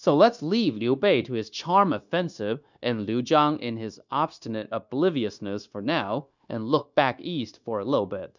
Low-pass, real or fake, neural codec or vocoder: 7.2 kHz; fake; codec, 16 kHz, 0.9 kbps, LongCat-Audio-Codec